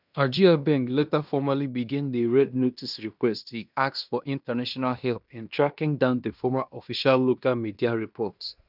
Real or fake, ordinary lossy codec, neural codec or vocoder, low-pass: fake; none; codec, 16 kHz in and 24 kHz out, 0.9 kbps, LongCat-Audio-Codec, fine tuned four codebook decoder; 5.4 kHz